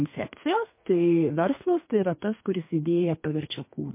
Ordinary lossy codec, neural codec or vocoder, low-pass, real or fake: MP3, 24 kbps; codec, 32 kHz, 1.9 kbps, SNAC; 3.6 kHz; fake